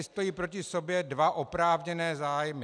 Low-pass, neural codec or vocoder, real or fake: 9.9 kHz; none; real